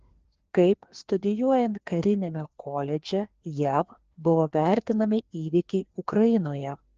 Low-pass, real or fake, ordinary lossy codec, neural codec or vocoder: 7.2 kHz; fake; Opus, 16 kbps; codec, 16 kHz, 2 kbps, FreqCodec, larger model